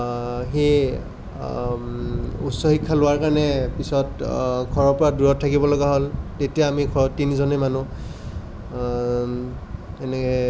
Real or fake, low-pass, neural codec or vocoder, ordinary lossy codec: real; none; none; none